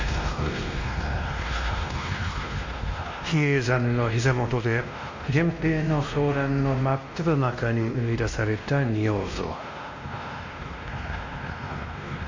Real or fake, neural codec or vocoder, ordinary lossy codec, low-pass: fake; codec, 16 kHz, 1 kbps, X-Codec, WavLM features, trained on Multilingual LibriSpeech; MP3, 48 kbps; 7.2 kHz